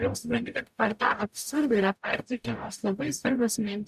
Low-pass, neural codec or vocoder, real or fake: 14.4 kHz; codec, 44.1 kHz, 0.9 kbps, DAC; fake